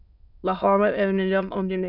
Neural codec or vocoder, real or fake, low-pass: autoencoder, 22.05 kHz, a latent of 192 numbers a frame, VITS, trained on many speakers; fake; 5.4 kHz